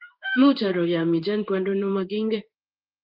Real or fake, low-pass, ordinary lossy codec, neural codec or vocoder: fake; 5.4 kHz; Opus, 32 kbps; codec, 16 kHz in and 24 kHz out, 1 kbps, XY-Tokenizer